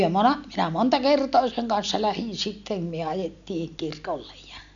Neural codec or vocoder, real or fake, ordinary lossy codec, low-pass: none; real; none; 7.2 kHz